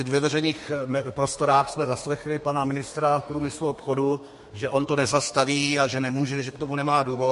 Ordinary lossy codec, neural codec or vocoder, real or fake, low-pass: MP3, 48 kbps; codec, 32 kHz, 1.9 kbps, SNAC; fake; 14.4 kHz